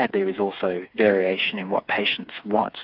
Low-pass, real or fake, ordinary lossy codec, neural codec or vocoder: 5.4 kHz; fake; AAC, 48 kbps; codec, 16 kHz, 4 kbps, FreqCodec, smaller model